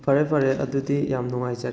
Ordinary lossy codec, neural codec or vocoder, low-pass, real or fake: none; none; none; real